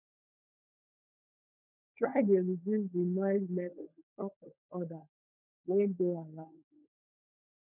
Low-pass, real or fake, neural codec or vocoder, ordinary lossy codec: 3.6 kHz; fake; codec, 16 kHz, 16 kbps, FunCodec, trained on LibriTTS, 50 frames a second; none